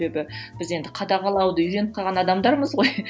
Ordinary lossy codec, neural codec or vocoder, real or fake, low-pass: none; none; real; none